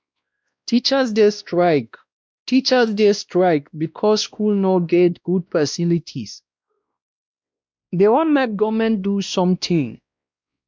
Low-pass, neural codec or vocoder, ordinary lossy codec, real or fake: none; codec, 16 kHz, 1 kbps, X-Codec, WavLM features, trained on Multilingual LibriSpeech; none; fake